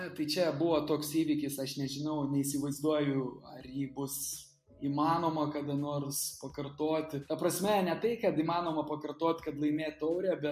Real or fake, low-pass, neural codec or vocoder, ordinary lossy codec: real; 14.4 kHz; none; MP3, 64 kbps